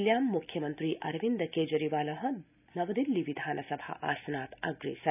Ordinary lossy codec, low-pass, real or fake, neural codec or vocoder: none; 3.6 kHz; real; none